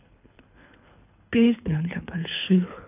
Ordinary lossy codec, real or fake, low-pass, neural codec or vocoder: none; fake; 3.6 kHz; codec, 24 kHz, 3 kbps, HILCodec